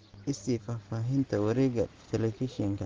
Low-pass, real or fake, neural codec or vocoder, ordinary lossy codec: 7.2 kHz; real; none; Opus, 16 kbps